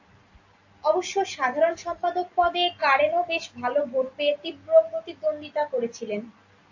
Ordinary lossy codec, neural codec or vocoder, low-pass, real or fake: AAC, 48 kbps; none; 7.2 kHz; real